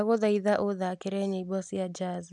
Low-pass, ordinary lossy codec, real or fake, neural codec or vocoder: 10.8 kHz; none; real; none